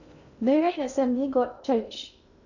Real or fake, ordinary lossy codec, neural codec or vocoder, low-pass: fake; none; codec, 16 kHz in and 24 kHz out, 0.6 kbps, FocalCodec, streaming, 2048 codes; 7.2 kHz